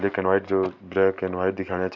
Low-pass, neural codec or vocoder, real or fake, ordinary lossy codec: 7.2 kHz; none; real; none